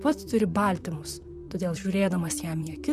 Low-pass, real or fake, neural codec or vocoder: 14.4 kHz; fake; vocoder, 44.1 kHz, 128 mel bands, Pupu-Vocoder